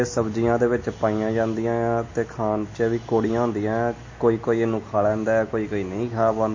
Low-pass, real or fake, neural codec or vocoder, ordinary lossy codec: 7.2 kHz; real; none; MP3, 32 kbps